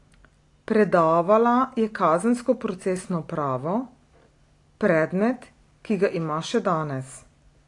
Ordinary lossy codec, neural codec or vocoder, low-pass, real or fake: AAC, 48 kbps; none; 10.8 kHz; real